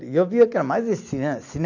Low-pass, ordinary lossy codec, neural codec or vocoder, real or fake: 7.2 kHz; none; none; real